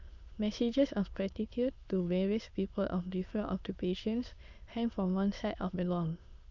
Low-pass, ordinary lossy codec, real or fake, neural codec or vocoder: 7.2 kHz; Opus, 64 kbps; fake; autoencoder, 22.05 kHz, a latent of 192 numbers a frame, VITS, trained on many speakers